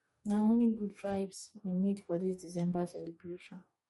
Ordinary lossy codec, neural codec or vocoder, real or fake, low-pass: MP3, 48 kbps; codec, 44.1 kHz, 2.6 kbps, DAC; fake; 19.8 kHz